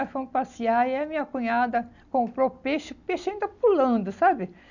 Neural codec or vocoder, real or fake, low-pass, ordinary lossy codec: none; real; 7.2 kHz; none